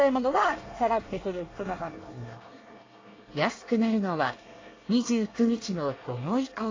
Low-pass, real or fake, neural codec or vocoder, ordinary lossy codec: 7.2 kHz; fake; codec, 24 kHz, 1 kbps, SNAC; AAC, 32 kbps